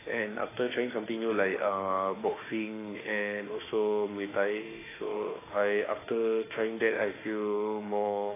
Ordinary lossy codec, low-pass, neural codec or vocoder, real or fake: AAC, 16 kbps; 3.6 kHz; autoencoder, 48 kHz, 32 numbers a frame, DAC-VAE, trained on Japanese speech; fake